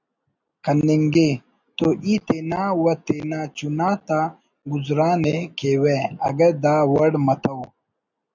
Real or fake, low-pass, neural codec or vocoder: real; 7.2 kHz; none